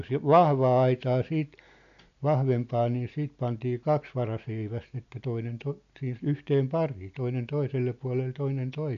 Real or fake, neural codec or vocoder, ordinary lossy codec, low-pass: real; none; none; 7.2 kHz